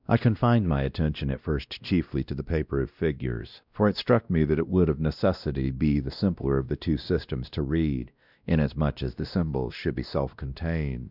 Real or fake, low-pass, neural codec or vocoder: fake; 5.4 kHz; codec, 16 kHz, 1 kbps, X-Codec, WavLM features, trained on Multilingual LibriSpeech